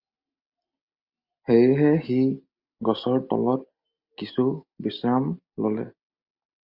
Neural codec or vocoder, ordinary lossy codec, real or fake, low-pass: none; Opus, 64 kbps; real; 5.4 kHz